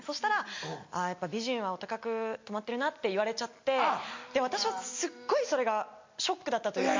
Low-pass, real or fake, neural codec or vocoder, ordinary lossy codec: 7.2 kHz; real; none; MP3, 48 kbps